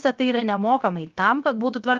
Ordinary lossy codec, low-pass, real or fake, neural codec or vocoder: Opus, 32 kbps; 7.2 kHz; fake; codec, 16 kHz, 0.7 kbps, FocalCodec